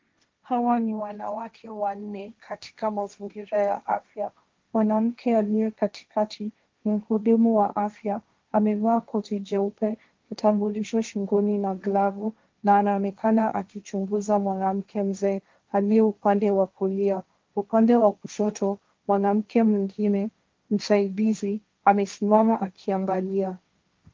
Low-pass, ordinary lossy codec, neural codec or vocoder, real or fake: 7.2 kHz; Opus, 32 kbps; codec, 16 kHz, 1.1 kbps, Voila-Tokenizer; fake